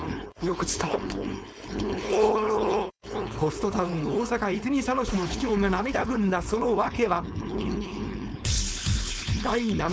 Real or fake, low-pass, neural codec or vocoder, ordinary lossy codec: fake; none; codec, 16 kHz, 4.8 kbps, FACodec; none